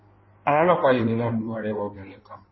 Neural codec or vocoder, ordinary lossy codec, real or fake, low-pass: codec, 16 kHz in and 24 kHz out, 1.1 kbps, FireRedTTS-2 codec; MP3, 24 kbps; fake; 7.2 kHz